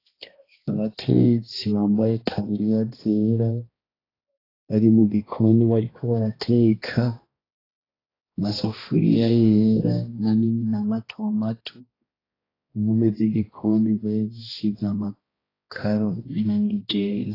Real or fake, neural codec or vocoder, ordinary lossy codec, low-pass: fake; codec, 16 kHz, 1 kbps, X-Codec, HuBERT features, trained on balanced general audio; AAC, 24 kbps; 5.4 kHz